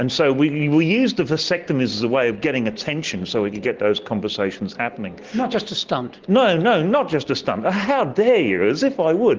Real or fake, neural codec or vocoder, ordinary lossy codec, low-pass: real; none; Opus, 16 kbps; 7.2 kHz